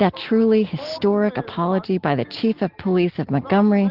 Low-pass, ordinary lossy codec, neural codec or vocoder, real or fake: 5.4 kHz; Opus, 16 kbps; none; real